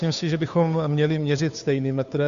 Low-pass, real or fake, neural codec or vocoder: 7.2 kHz; fake; codec, 16 kHz, 2 kbps, FunCodec, trained on Chinese and English, 25 frames a second